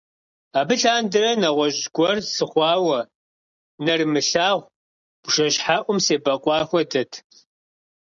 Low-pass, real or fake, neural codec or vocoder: 7.2 kHz; real; none